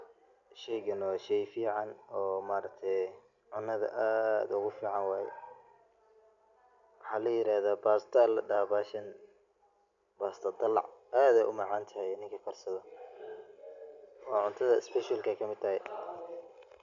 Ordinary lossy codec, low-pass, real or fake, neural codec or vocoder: none; 7.2 kHz; real; none